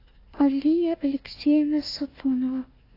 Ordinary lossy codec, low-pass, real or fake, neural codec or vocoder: AAC, 32 kbps; 5.4 kHz; fake; codec, 16 kHz, 1 kbps, FunCodec, trained on Chinese and English, 50 frames a second